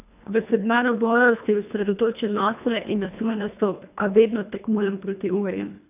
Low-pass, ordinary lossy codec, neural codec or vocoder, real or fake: 3.6 kHz; none; codec, 24 kHz, 1.5 kbps, HILCodec; fake